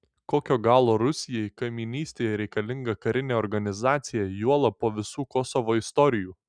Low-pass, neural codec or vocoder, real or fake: 9.9 kHz; none; real